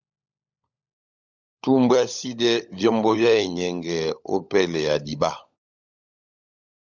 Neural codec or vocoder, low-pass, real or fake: codec, 16 kHz, 16 kbps, FunCodec, trained on LibriTTS, 50 frames a second; 7.2 kHz; fake